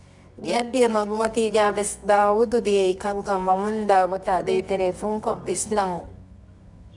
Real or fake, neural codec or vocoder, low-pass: fake; codec, 24 kHz, 0.9 kbps, WavTokenizer, medium music audio release; 10.8 kHz